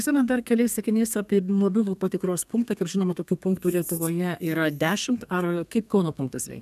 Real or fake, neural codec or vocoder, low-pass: fake; codec, 32 kHz, 1.9 kbps, SNAC; 14.4 kHz